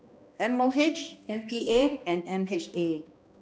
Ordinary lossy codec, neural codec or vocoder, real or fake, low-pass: none; codec, 16 kHz, 1 kbps, X-Codec, HuBERT features, trained on balanced general audio; fake; none